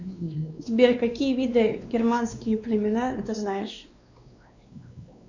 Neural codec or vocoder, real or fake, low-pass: codec, 16 kHz, 2 kbps, X-Codec, WavLM features, trained on Multilingual LibriSpeech; fake; 7.2 kHz